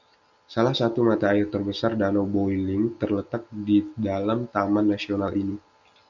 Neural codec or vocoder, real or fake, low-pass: none; real; 7.2 kHz